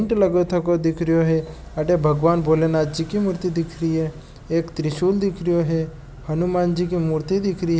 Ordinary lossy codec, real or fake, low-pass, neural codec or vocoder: none; real; none; none